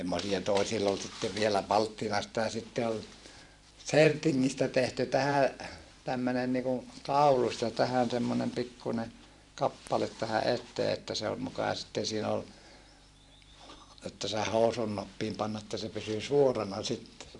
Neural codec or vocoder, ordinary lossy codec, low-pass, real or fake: vocoder, 44.1 kHz, 128 mel bands every 512 samples, BigVGAN v2; none; 10.8 kHz; fake